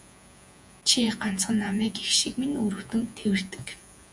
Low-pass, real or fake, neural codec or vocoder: 10.8 kHz; fake; vocoder, 48 kHz, 128 mel bands, Vocos